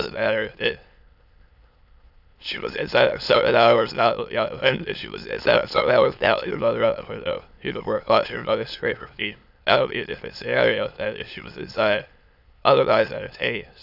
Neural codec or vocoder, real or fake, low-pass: autoencoder, 22.05 kHz, a latent of 192 numbers a frame, VITS, trained on many speakers; fake; 5.4 kHz